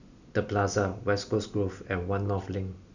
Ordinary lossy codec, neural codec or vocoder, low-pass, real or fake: none; none; 7.2 kHz; real